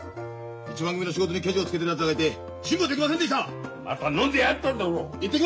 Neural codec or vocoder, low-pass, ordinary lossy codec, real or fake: none; none; none; real